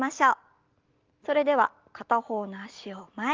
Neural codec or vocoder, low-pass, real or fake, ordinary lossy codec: none; 7.2 kHz; real; Opus, 32 kbps